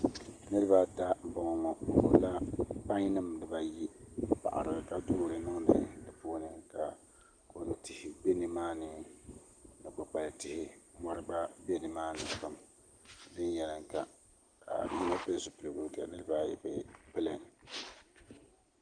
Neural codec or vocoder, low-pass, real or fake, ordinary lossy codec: none; 9.9 kHz; real; Opus, 24 kbps